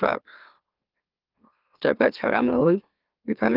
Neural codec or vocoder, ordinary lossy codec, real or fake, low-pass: autoencoder, 44.1 kHz, a latent of 192 numbers a frame, MeloTTS; Opus, 32 kbps; fake; 5.4 kHz